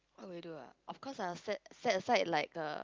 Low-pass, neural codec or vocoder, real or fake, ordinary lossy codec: 7.2 kHz; none; real; Opus, 24 kbps